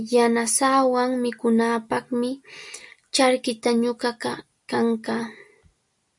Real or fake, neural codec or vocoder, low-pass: real; none; 10.8 kHz